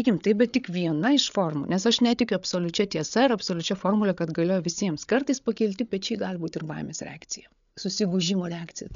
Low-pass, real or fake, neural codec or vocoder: 7.2 kHz; fake; codec, 16 kHz, 8 kbps, FreqCodec, larger model